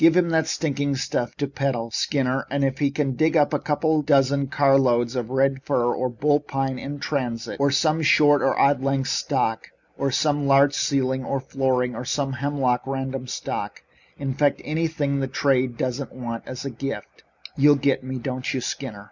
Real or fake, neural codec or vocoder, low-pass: real; none; 7.2 kHz